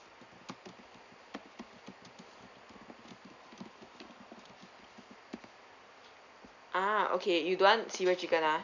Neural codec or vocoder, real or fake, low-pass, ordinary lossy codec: none; real; 7.2 kHz; none